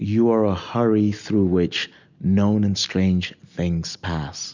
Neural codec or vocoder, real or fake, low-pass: none; real; 7.2 kHz